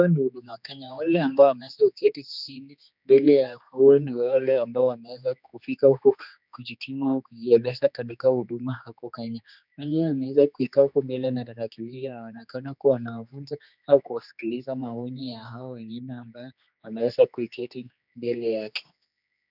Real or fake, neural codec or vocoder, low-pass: fake; codec, 16 kHz, 2 kbps, X-Codec, HuBERT features, trained on general audio; 5.4 kHz